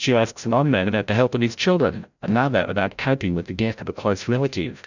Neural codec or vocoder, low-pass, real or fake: codec, 16 kHz, 0.5 kbps, FreqCodec, larger model; 7.2 kHz; fake